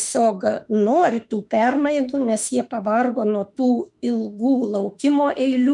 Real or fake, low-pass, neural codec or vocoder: fake; 10.8 kHz; autoencoder, 48 kHz, 32 numbers a frame, DAC-VAE, trained on Japanese speech